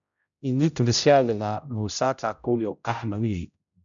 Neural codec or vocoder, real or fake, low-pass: codec, 16 kHz, 0.5 kbps, X-Codec, HuBERT features, trained on general audio; fake; 7.2 kHz